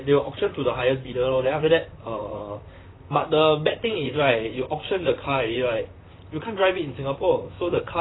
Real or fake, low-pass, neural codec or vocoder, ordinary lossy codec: fake; 7.2 kHz; vocoder, 44.1 kHz, 128 mel bands, Pupu-Vocoder; AAC, 16 kbps